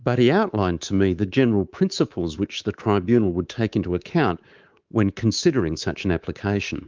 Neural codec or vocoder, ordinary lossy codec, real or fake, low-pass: codec, 24 kHz, 3.1 kbps, DualCodec; Opus, 24 kbps; fake; 7.2 kHz